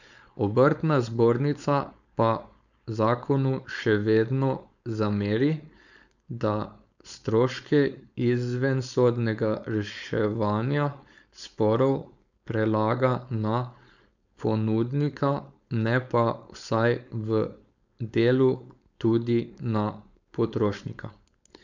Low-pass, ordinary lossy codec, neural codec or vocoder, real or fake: 7.2 kHz; none; codec, 16 kHz, 4.8 kbps, FACodec; fake